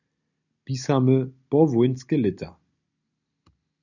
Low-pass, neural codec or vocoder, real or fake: 7.2 kHz; none; real